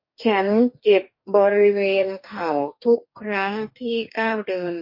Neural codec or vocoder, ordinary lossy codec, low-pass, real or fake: codec, 44.1 kHz, 2.6 kbps, DAC; MP3, 32 kbps; 5.4 kHz; fake